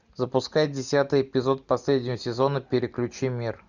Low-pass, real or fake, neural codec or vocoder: 7.2 kHz; real; none